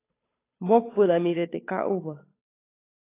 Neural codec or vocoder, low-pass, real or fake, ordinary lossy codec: codec, 16 kHz, 8 kbps, FunCodec, trained on Chinese and English, 25 frames a second; 3.6 kHz; fake; AAC, 24 kbps